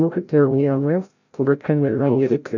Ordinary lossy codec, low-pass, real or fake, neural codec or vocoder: none; 7.2 kHz; fake; codec, 16 kHz, 0.5 kbps, FreqCodec, larger model